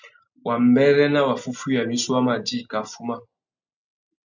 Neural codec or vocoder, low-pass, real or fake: none; 7.2 kHz; real